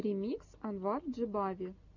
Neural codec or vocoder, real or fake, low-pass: none; real; 7.2 kHz